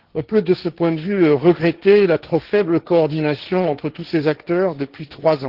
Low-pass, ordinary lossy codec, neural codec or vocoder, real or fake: 5.4 kHz; Opus, 16 kbps; codec, 16 kHz, 1.1 kbps, Voila-Tokenizer; fake